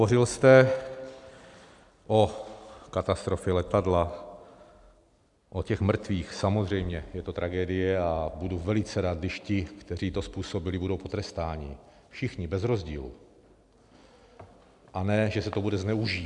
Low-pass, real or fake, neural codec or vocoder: 10.8 kHz; real; none